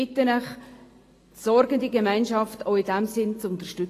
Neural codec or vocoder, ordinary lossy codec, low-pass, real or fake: none; AAC, 48 kbps; 14.4 kHz; real